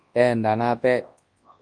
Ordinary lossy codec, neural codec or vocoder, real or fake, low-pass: Opus, 32 kbps; codec, 24 kHz, 0.9 kbps, WavTokenizer, large speech release; fake; 9.9 kHz